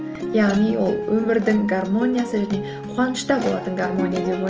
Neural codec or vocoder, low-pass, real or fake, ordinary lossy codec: none; 7.2 kHz; real; Opus, 24 kbps